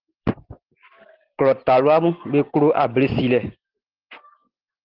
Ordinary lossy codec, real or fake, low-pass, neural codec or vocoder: Opus, 16 kbps; real; 5.4 kHz; none